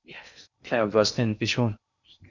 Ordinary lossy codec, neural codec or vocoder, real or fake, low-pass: Opus, 64 kbps; codec, 16 kHz in and 24 kHz out, 0.6 kbps, FocalCodec, streaming, 4096 codes; fake; 7.2 kHz